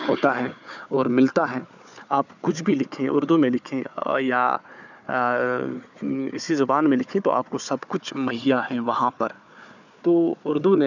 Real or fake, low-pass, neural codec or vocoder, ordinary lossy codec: fake; 7.2 kHz; codec, 16 kHz, 4 kbps, FunCodec, trained on Chinese and English, 50 frames a second; none